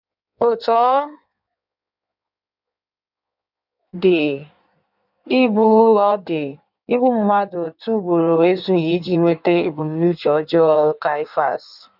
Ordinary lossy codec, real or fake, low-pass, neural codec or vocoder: none; fake; 5.4 kHz; codec, 16 kHz in and 24 kHz out, 1.1 kbps, FireRedTTS-2 codec